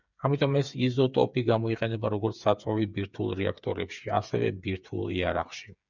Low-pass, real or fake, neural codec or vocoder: 7.2 kHz; fake; codec, 16 kHz, 8 kbps, FreqCodec, smaller model